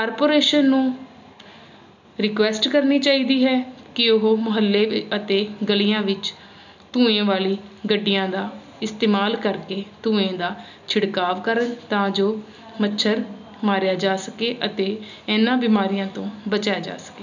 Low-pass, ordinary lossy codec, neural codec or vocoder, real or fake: 7.2 kHz; none; none; real